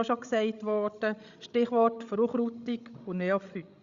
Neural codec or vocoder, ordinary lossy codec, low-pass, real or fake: codec, 16 kHz, 16 kbps, FreqCodec, larger model; Opus, 64 kbps; 7.2 kHz; fake